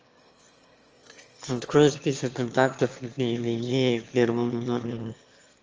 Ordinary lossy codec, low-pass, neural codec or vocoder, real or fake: Opus, 24 kbps; 7.2 kHz; autoencoder, 22.05 kHz, a latent of 192 numbers a frame, VITS, trained on one speaker; fake